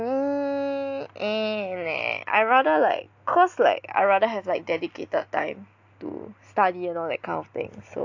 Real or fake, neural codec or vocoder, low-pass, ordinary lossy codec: real; none; 7.2 kHz; AAC, 48 kbps